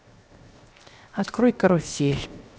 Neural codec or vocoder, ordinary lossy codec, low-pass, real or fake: codec, 16 kHz, 0.7 kbps, FocalCodec; none; none; fake